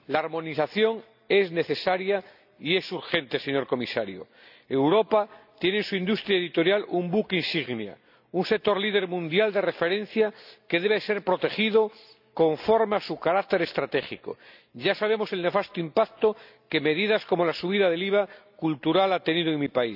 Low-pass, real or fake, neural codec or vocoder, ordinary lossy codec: 5.4 kHz; real; none; none